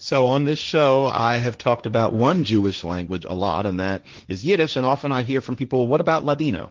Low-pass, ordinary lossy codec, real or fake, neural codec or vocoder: 7.2 kHz; Opus, 32 kbps; fake; codec, 16 kHz, 1.1 kbps, Voila-Tokenizer